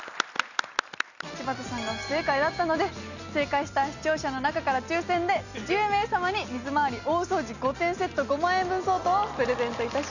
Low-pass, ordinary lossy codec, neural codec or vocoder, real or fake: 7.2 kHz; none; none; real